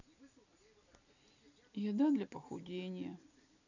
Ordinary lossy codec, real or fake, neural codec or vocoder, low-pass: none; real; none; 7.2 kHz